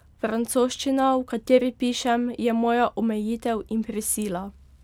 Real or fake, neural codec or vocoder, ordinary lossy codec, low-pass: real; none; none; 19.8 kHz